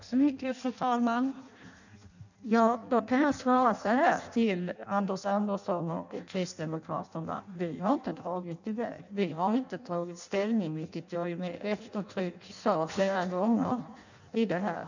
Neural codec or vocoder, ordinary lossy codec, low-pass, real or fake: codec, 16 kHz in and 24 kHz out, 0.6 kbps, FireRedTTS-2 codec; none; 7.2 kHz; fake